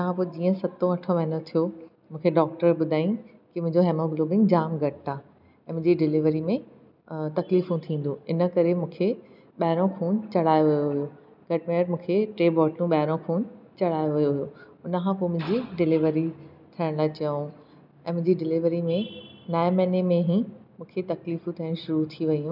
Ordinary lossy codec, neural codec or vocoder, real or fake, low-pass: none; none; real; 5.4 kHz